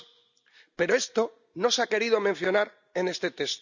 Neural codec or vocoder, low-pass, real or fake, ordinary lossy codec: none; 7.2 kHz; real; none